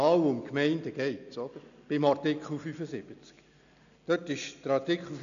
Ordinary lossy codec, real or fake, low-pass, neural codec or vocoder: none; real; 7.2 kHz; none